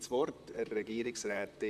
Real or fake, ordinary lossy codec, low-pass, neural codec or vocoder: fake; none; 14.4 kHz; vocoder, 44.1 kHz, 128 mel bands, Pupu-Vocoder